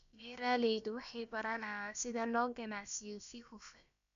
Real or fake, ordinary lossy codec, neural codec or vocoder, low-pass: fake; AAC, 96 kbps; codec, 16 kHz, about 1 kbps, DyCAST, with the encoder's durations; 7.2 kHz